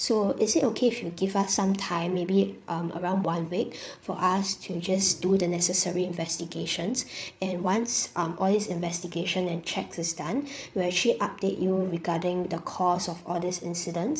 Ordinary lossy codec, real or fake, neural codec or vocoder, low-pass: none; fake; codec, 16 kHz, 8 kbps, FreqCodec, larger model; none